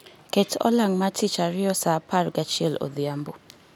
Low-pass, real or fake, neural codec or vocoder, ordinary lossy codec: none; real; none; none